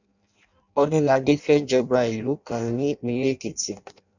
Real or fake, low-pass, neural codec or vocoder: fake; 7.2 kHz; codec, 16 kHz in and 24 kHz out, 0.6 kbps, FireRedTTS-2 codec